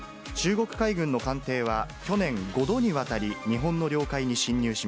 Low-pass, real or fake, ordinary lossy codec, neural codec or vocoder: none; real; none; none